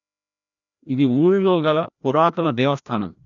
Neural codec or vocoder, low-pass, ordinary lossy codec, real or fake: codec, 16 kHz, 1 kbps, FreqCodec, larger model; 7.2 kHz; none; fake